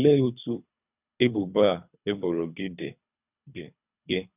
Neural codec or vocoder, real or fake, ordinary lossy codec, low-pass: codec, 24 kHz, 3 kbps, HILCodec; fake; none; 3.6 kHz